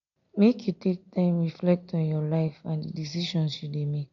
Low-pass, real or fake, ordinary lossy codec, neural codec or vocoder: 7.2 kHz; real; MP3, 48 kbps; none